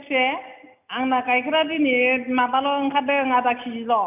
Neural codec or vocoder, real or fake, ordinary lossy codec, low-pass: none; real; none; 3.6 kHz